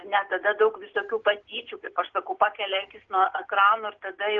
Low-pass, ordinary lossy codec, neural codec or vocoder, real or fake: 7.2 kHz; Opus, 16 kbps; none; real